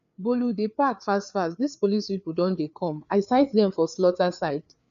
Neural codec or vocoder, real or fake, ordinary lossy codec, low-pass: codec, 16 kHz, 4 kbps, FreqCodec, larger model; fake; none; 7.2 kHz